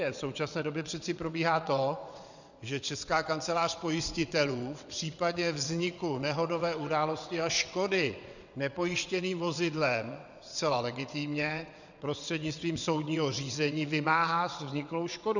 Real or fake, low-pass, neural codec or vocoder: fake; 7.2 kHz; vocoder, 22.05 kHz, 80 mel bands, WaveNeXt